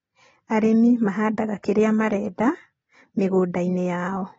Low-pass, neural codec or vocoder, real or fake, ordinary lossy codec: 9.9 kHz; none; real; AAC, 24 kbps